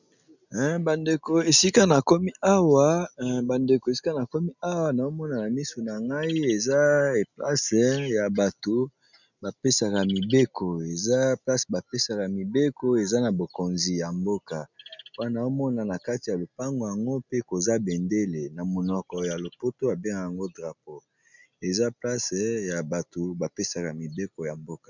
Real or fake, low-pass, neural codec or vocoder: real; 7.2 kHz; none